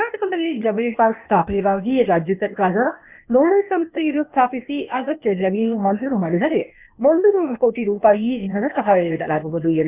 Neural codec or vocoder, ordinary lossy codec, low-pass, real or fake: codec, 16 kHz, 0.8 kbps, ZipCodec; none; 3.6 kHz; fake